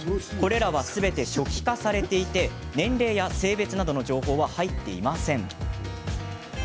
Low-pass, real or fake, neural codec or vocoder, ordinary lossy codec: none; real; none; none